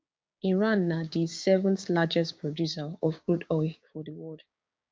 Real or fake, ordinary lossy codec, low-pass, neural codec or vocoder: fake; none; none; codec, 16 kHz, 6 kbps, DAC